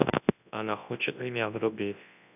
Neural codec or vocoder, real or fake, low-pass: codec, 24 kHz, 0.9 kbps, WavTokenizer, large speech release; fake; 3.6 kHz